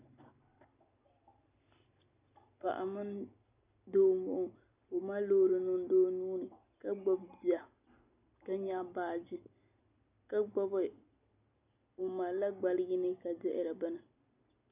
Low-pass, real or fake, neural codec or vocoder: 3.6 kHz; real; none